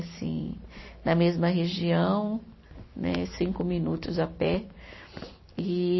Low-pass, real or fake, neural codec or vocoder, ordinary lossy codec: 7.2 kHz; real; none; MP3, 24 kbps